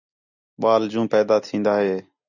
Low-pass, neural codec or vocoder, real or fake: 7.2 kHz; none; real